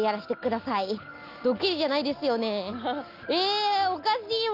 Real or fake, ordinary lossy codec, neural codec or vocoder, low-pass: real; Opus, 32 kbps; none; 5.4 kHz